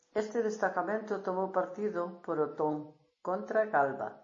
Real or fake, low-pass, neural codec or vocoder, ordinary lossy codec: real; 7.2 kHz; none; MP3, 32 kbps